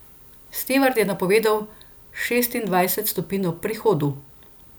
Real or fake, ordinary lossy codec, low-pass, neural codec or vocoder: real; none; none; none